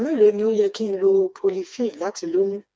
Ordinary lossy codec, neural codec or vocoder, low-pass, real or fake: none; codec, 16 kHz, 2 kbps, FreqCodec, smaller model; none; fake